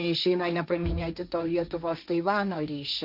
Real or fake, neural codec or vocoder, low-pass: fake; codec, 16 kHz, 1.1 kbps, Voila-Tokenizer; 5.4 kHz